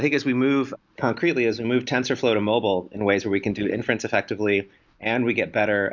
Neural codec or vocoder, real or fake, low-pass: none; real; 7.2 kHz